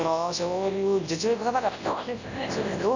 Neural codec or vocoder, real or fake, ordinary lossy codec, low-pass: codec, 24 kHz, 0.9 kbps, WavTokenizer, large speech release; fake; Opus, 64 kbps; 7.2 kHz